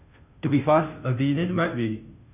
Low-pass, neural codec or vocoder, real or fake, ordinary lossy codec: 3.6 kHz; codec, 16 kHz, 0.5 kbps, FunCodec, trained on Chinese and English, 25 frames a second; fake; none